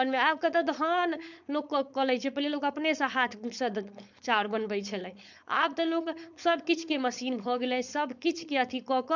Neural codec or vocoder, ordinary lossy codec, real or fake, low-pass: codec, 16 kHz, 4.8 kbps, FACodec; none; fake; 7.2 kHz